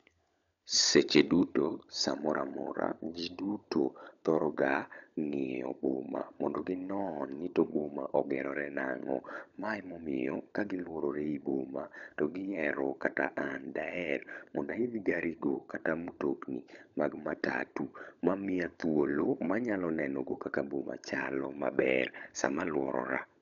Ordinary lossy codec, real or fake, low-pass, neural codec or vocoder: none; fake; 7.2 kHz; codec, 16 kHz, 16 kbps, FunCodec, trained on LibriTTS, 50 frames a second